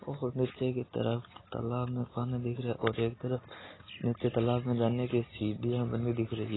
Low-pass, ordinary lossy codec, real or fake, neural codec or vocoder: 7.2 kHz; AAC, 16 kbps; real; none